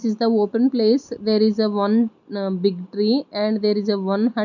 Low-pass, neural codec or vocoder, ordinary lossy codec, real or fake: 7.2 kHz; none; none; real